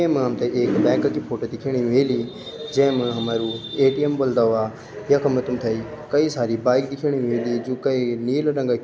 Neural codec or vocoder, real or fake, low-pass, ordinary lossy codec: none; real; none; none